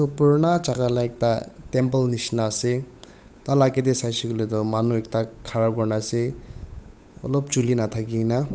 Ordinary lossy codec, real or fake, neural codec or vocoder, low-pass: none; fake; codec, 16 kHz, 8 kbps, FunCodec, trained on Chinese and English, 25 frames a second; none